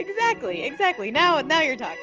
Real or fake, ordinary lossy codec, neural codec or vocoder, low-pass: real; Opus, 24 kbps; none; 7.2 kHz